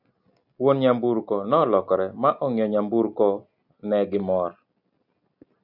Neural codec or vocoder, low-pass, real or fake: none; 5.4 kHz; real